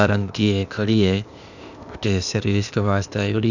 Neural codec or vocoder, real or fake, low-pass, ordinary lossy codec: codec, 16 kHz, 0.8 kbps, ZipCodec; fake; 7.2 kHz; none